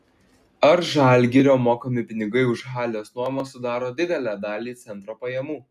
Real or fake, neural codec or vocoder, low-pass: real; none; 14.4 kHz